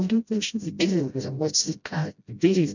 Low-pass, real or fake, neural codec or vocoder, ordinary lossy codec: 7.2 kHz; fake; codec, 16 kHz, 0.5 kbps, FreqCodec, smaller model; none